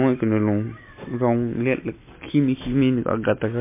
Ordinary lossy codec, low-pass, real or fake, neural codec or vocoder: MP3, 24 kbps; 3.6 kHz; real; none